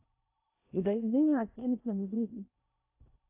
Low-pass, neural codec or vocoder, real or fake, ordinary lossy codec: 3.6 kHz; codec, 16 kHz in and 24 kHz out, 0.6 kbps, FocalCodec, streaming, 4096 codes; fake; AAC, 32 kbps